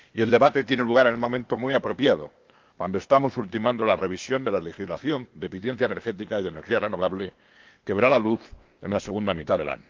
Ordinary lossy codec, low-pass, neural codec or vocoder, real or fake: Opus, 32 kbps; 7.2 kHz; codec, 16 kHz, 0.8 kbps, ZipCodec; fake